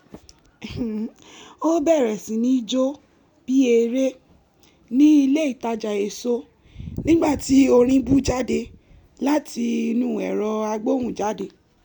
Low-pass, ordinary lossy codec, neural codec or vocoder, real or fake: 19.8 kHz; none; none; real